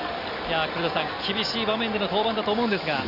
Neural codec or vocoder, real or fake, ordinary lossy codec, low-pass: none; real; none; 5.4 kHz